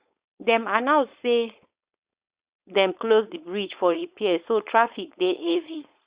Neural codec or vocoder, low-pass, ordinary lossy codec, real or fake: codec, 16 kHz, 4.8 kbps, FACodec; 3.6 kHz; Opus, 64 kbps; fake